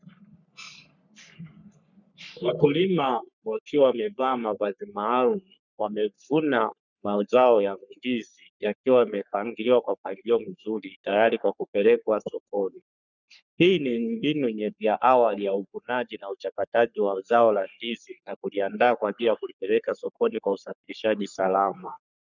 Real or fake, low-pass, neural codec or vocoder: fake; 7.2 kHz; codec, 44.1 kHz, 3.4 kbps, Pupu-Codec